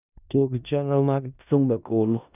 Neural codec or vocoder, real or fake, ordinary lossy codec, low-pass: codec, 16 kHz in and 24 kHz out, 0.4 kbps, LongCat-Audio-Codec, four codebook decoder; fake; none; 3.6 kHz